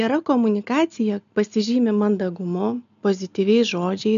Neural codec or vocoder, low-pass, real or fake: none; 7.2 kHz; real